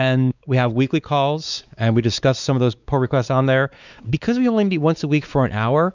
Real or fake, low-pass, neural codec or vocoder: fake; 7.2 kHz; codec, 16 kHz, 4 kbps, X-Codec, WavLM features, trained on Multilingual LibriSpeech